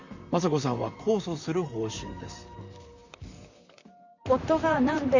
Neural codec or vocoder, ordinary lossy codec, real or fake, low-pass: vocoder, 44.1 kHz, 128 mel bands, Pupu-Vocoder; none; fake; 7.2 kHz